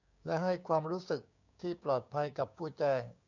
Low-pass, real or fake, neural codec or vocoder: 7.2 kHz; fake; codec, 16 kHz, 6 kbps, DAC